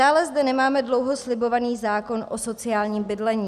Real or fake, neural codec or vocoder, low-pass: real; none; 14.4 kHz